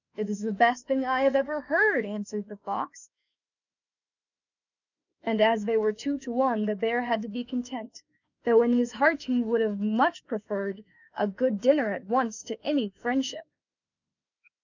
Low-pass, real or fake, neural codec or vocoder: 7.2 kHz; fake; autoencoder, 48 kHz, 32 numbers a frame, DAC-VAE, trained on Japanese speech